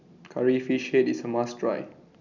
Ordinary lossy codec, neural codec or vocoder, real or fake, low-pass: none; none; real; 7.2 kHz